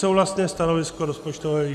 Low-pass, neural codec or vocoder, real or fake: 14.4 kHz; none; real